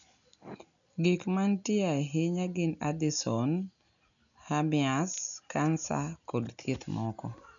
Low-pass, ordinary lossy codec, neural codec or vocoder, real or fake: 7.2 kHz; none; none; real